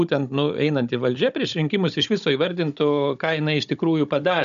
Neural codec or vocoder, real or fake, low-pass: codec, 16 kHz, 16 kbps, FunCodec, trained on Chinese and English, 50 frames a second; fake; 7.2 kHz